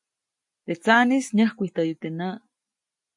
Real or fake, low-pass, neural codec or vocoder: real; 10.8 kHz; none